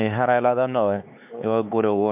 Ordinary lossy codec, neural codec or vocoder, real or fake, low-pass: MP3, 32 kbps; codec, 16 kHz, 4 kbps, X-Codec, HuBERT features, trained on LibriSpeech; fake; 3.6 kHz